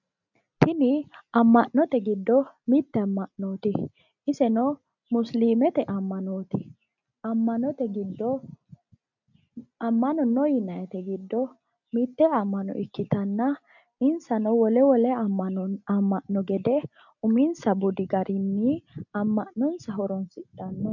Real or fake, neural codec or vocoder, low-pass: real; none; 7.2 kHz